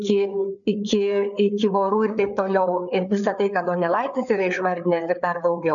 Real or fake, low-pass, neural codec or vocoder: fake; 7.2 kHz; codec, 16 kHz, 4 kbps, FreqCodec, larger model